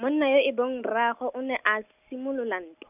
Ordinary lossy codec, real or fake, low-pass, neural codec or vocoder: none; real; 3.6 kHz; none